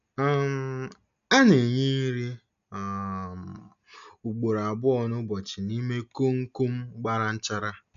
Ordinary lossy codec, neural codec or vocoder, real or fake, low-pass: none; none; real; 7.2 kHz